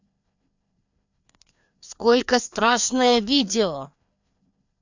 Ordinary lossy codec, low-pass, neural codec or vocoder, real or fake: none; 7.2 kHz; codec, 16 kHz, 2 kbps, FreqCodec, larger model; fake